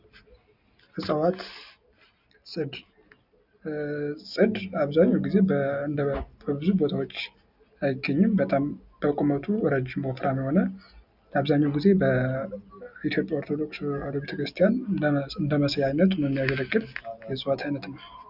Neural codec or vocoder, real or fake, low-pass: none; real; 5.4 kHz